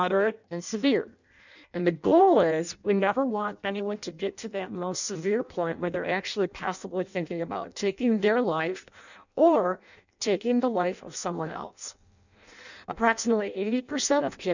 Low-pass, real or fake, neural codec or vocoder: 7.2 kHz; fake; codec, 16 kHz in and 24 kHz out, 0.6 kbps, FireRedTTS-2 codec